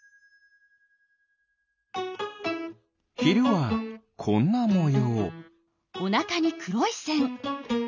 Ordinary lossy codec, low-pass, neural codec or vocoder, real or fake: none; 7.2 kHz; none; real